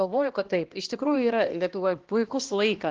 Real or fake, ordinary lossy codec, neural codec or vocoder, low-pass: fake; Opus, 16 kbps; codec, 16 kHz, 1 kbps, X-Codec, HuBERT features, trained on balanced general audio; 7.2 kHz